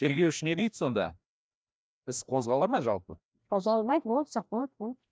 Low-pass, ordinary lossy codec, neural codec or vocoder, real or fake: none; none; codec, 16 kHz, 1 kbps, FreqCodec, larger model; fake